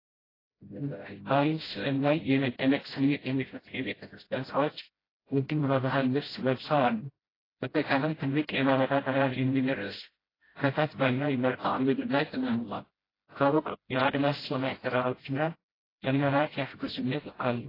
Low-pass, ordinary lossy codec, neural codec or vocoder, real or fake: 5.4 kHz; AAC, 24 kbps; codec, 16 kHz, 0.5 kbps, FreqCodec, smaller model; fake